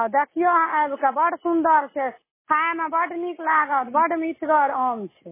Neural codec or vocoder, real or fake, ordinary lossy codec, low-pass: none; real; MP3, 16 kbps; 3.6 kHz